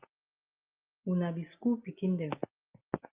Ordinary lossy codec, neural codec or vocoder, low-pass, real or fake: Opus, 24 kbps; none; 3.6 kHz; real